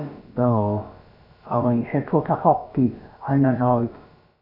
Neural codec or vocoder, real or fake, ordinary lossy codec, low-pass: codec, 16 kHz, about 1 kbps, DyCAST, with the encoder's durations; fake; none; 5.4 kHz